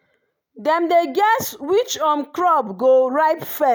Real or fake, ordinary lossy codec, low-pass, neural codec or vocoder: real; none; none; none